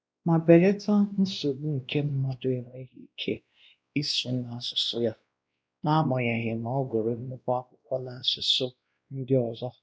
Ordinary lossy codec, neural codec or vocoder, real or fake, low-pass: none; codec, 16 kHz, 1 kbps, X-Codec, WavLM features, trained on Multilingual LibriSpeech; fake; none